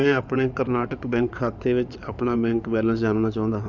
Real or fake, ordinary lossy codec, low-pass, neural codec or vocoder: fake; none; 7.2 kHz; codec, 16 kHz in and 24 kHz out, 2.2 kbps, FireRedTTS-2 codec